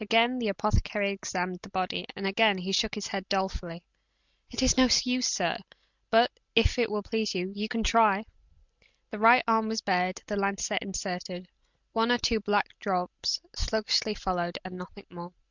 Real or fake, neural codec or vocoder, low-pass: real; none; 7.2 kHz